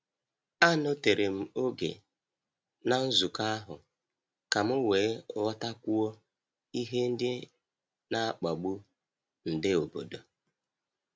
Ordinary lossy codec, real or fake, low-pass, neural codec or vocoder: none; real; none; none